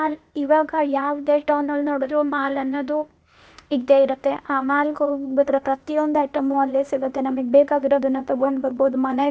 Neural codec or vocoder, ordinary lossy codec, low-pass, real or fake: codec, 16 kHz, 0.8 kbps, ZipCodec; none; none; fake